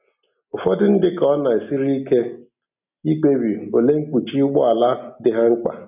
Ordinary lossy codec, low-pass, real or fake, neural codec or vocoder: none; 3.6 kHz; real; none